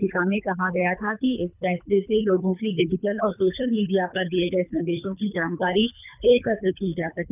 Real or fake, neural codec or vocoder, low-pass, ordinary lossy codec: fake; codec, 24 kHz, 3 kbps, HILCodec; 3.6 kHz; none